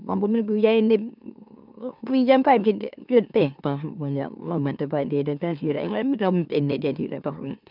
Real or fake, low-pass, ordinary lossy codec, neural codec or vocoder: fake; 5.4 kHz; none; autoencoder, 44.1 kHz, a latent of 192 numbers a frame, MeloTTS